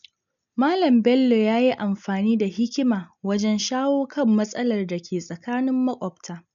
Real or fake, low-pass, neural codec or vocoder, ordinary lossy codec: real; 7.2 kHz; none; none